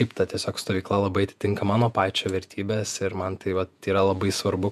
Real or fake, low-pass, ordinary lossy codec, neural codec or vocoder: fake; 14.4 kHz; MP3, 96 kbps; vocoder, 48 kHz, 128 mel bands, Vocos